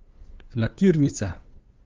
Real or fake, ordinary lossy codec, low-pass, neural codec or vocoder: fake; Opus, 32 kbps; 7.2 kHz; codec, 16 kHz, 2 kbps, FunCodec, trained on LibriTTS, 25 frames a second